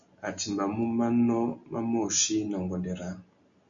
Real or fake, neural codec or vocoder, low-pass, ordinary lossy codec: real; none; 7.2 kHz; AAC, 64 kbps